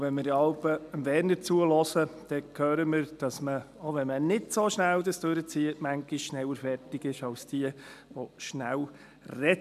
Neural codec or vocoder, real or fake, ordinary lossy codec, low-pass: none; real; none; 14.4 kHz